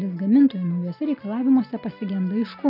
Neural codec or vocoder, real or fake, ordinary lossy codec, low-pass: none; real; AAC, 48 kbps; 5.4 kHz